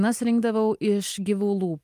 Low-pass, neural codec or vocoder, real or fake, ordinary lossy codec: 14.4 kHz; none; real; Opus, 32 kbps